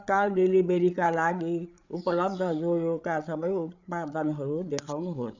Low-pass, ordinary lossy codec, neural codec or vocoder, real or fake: 7.2 kHz; none; codec, 16 kHz, 8 kbps, FreqCodec, larger model; fake